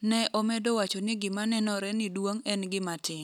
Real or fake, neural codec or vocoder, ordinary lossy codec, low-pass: real; none; none; 19.8 kHz